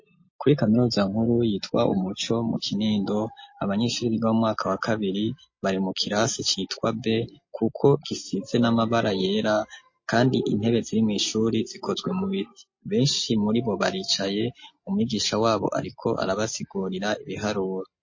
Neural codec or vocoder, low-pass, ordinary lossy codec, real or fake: none; 7.2 kHz; MP3, 32 kbps; real